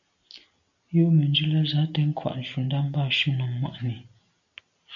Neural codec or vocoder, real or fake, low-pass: none; real; 7.2 kHz